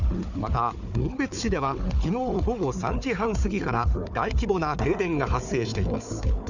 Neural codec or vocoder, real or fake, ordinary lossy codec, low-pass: codec, 16 kHz, 4 kbps, FunCodec, trained on Chinese and English, 50 frames a second; fake; none; 7.2 kHz